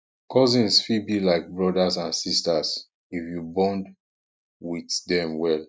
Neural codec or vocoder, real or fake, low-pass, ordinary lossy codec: none; real; none; none